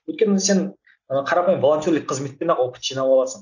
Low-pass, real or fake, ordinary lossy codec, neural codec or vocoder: 7.2 kHz; real; none; none